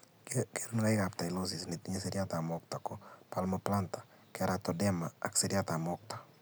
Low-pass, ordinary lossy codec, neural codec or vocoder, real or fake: none; none; none; real